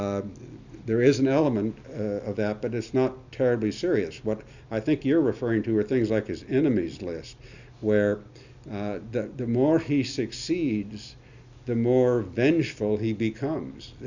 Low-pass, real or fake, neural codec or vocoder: 7.2 kHz; real; none